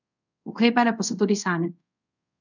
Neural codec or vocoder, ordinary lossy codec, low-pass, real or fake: codec, 24 kHz, 0.5 kbps, DualCodec; none; 7.2 kHz; fake